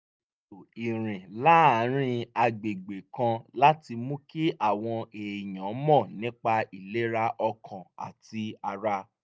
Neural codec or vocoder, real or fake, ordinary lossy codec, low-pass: none; real; none; none